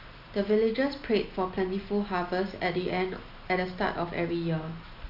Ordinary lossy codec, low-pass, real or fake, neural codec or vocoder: none; 5.4 kHz; real; none